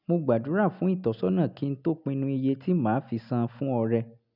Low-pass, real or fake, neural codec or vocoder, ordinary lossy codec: 5.4 kHz; real; none; none